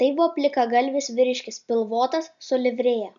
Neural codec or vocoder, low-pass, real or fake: none; 7.2 kHz; real